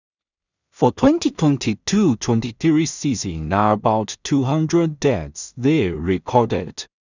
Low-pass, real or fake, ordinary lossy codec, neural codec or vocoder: 7.2 kHz; fake; none; codec, 16 kHz in and 24 kHz out, 0.4 kbps, LongCat-Audio-Codec, two codebook decoder